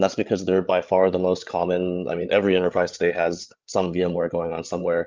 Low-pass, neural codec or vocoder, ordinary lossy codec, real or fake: 7.2 kHz; codec, 16 kHz, 8 kbps, FreqCodec, larger model; Opus, 24 kbps; fake